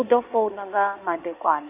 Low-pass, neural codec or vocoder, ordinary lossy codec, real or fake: 3.6 kHz; codec, 16 kHz in and 24 kHz out, 2.2 kbps, FireRedTTS-2 codec; none; fake